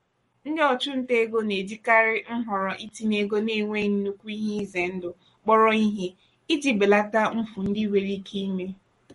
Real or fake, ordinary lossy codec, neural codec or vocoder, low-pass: fake; MP3, 48 kbps; codec, 44.1 kHz, 7.8 kbps, Pupu-Codec; 19.8 kHz